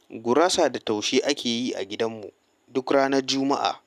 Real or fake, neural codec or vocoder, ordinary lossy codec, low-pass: real; none; none; 14.4 kHz